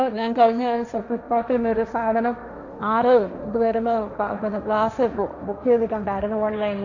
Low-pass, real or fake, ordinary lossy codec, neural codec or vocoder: 7.2 kHz; fake; none; codec, 16 kHz, 1.1 kbps, Voila-Tokenizer